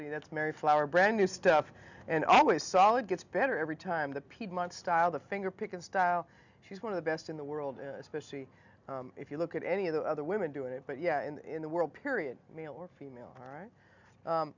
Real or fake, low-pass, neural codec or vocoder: real; 7.2 kHz; none